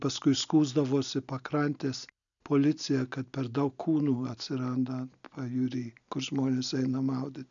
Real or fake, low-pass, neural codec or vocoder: real; 7.2 kHz; none